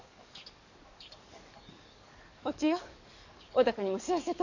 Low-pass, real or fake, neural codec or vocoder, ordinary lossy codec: 7.2 kHz; fake; codec, 44.1 kHz, 7.8 kbps, DAC; none